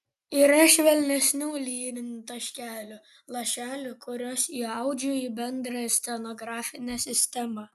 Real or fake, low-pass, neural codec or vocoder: real; 14.4 kHz; none